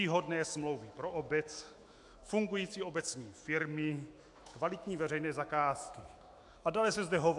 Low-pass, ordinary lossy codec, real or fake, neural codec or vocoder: 10.8 kHz; AAC, 64 kbps; fake; autoencoder, 48 kHz, 128 numbers a frame, DAC-VAE, trained on Japanese speech